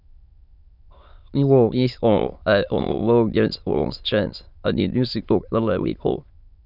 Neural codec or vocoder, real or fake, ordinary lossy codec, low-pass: autoencoder, 22.05 kHz, a latent of 192 numbers a frame, VITS, trained on many speakers; fake; none; 5.4 kHz